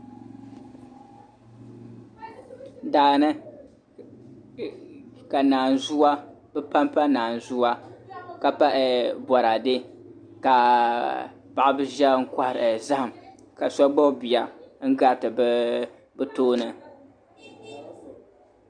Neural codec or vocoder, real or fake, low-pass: none; real; 9.9 kHz